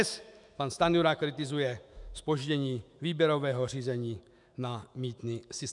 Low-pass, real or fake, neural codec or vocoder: 10.8 kHz; fake; autoencoder, 48 kHz, 128 numbers a frame, DAC-VAE, trained on Japanese speech